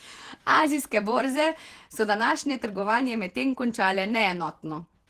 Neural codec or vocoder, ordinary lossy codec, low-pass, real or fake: vocoder, 48 kHz, 128 mel bands, Vocos; Opus, 16 kbps; 14.4 kHz; fake